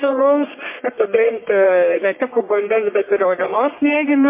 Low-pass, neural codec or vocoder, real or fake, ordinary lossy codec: 3.6 kHz; codec, 44.1 kHz, 1.7 kbps, Pupu-Codec; fake; MP3, 24 kbps